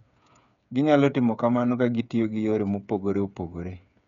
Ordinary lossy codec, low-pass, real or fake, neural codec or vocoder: none; 7.2 kHz; fake; codec, 16 kHz, 8 kbps, FreqCodec, smaller model